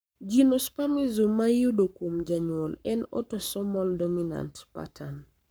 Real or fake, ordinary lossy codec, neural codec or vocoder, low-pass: fake; none; codec, 44.1 kHz, 7.8 kbps, Pupu-Codec; none